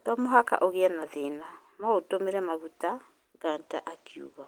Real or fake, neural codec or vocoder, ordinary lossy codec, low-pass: real; none; Opus, 24 kbps; 19.8 kHz